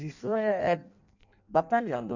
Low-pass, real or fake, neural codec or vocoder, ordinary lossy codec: 7.2 kHz; fake; codec, 16 kHz in and 24 kHz out, 0.6 kbps, FireRedTTS-2 codec; none